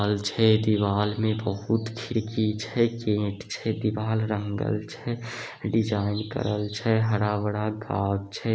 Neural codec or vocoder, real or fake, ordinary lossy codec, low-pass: none; real; none; none